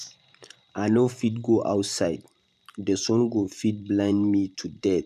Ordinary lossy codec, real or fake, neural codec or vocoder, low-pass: none; fake; vocoder, 44.1 kHz, 128 mel bands every 512 samples, BigVGAN v2; 14.4 kHz